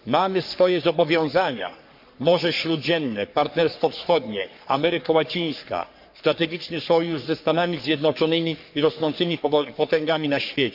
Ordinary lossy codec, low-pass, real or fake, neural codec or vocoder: MP3, 48 kbps; 5.4 kHz; fake; codec, 44.1 kHz, 3.4 kbps, Pupu-Codec